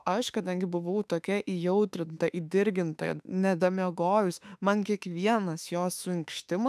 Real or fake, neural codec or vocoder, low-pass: fake; autoencoder, 48 kHz, 32 numbers a frame, DAC-VAE, trained on Japanese speech; 14.4 kHz